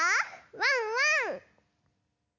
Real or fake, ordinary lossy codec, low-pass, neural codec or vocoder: real; none; 7.2 kHz; none